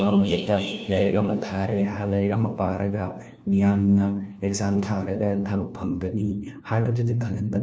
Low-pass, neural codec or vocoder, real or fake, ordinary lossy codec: none; codec, 16 kHz, 1 kbps, FunCodec, trained on LibriTTS, 50 frames a second; fake; none